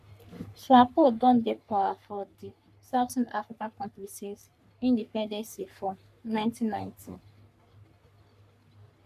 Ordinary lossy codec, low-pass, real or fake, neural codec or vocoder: none; 14.4 kHz; fake; codec, 44.1 kHz, 3.4 kbps, Pupu-Codec